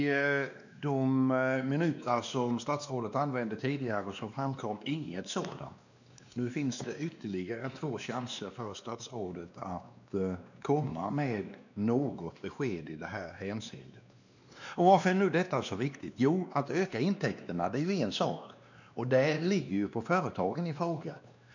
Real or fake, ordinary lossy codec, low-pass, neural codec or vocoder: fake; none; 7.2 kHz; codec, 16 kHz, 2 kbps, X-Codec, WavLM features, trained on Multilingual LibriSpeech